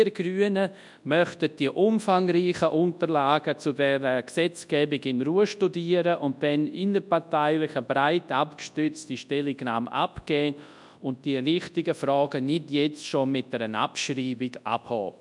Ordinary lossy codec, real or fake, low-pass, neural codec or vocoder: MP3, 96 kbps; fake; 10.8 kHz; codec, 24 kHz, 0.9 kbps, WavTokenizer, large speech release